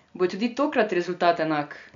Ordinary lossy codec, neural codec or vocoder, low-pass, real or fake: MP3, 64 kbps; none; 7.2 kHz; real